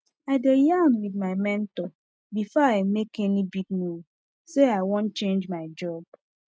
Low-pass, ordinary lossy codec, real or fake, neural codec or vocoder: none; none; real; none